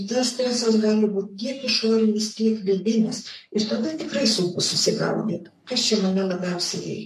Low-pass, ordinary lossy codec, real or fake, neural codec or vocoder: 14.4 kHz; MP3, 64 kbps; fake; codec, 44.1 kHz, 3.4 kbps, Pupu-Codec